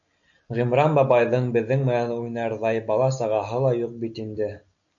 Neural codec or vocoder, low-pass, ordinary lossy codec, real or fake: none; 7.2 kHz; MP3, 96 kbps; real